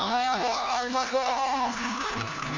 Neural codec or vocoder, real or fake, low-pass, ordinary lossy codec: codec, 16 kHz, 1 kbps, FunCodec, trained on LibriTTS, 50 frames a second; fake; 7.2 kHz; none